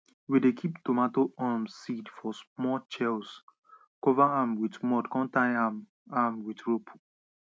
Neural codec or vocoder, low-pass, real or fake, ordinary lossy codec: none; none; real; none